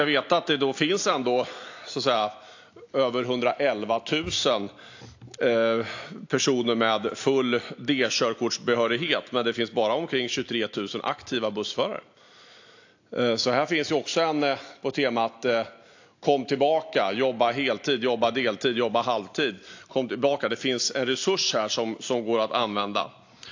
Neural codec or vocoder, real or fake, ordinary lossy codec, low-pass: none; real; AAC, 48 kbps; 7.2 kHz